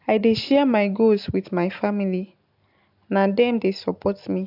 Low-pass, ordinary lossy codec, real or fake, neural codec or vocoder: 5.4 kHz; AAC, 48 kbps; real; none